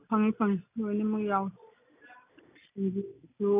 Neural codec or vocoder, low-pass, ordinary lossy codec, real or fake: none; 3.6 kHz; none; real